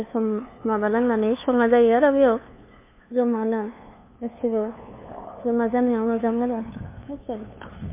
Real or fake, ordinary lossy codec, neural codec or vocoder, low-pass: fake; MP3, 24 kbps; codec, 16 kHz, 2 kbps, FunCodec, trained on LibriTTS, 25 frames a second; 3.6 kHz